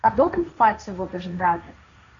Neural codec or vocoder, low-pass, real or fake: codec, 16 kHz, 1.1 kbps, Voila-Tokenizer; 7.2 kHz; fake